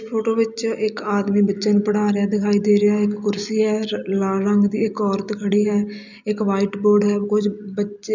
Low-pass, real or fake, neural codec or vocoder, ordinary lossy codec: 7.2 kHz; real; none; none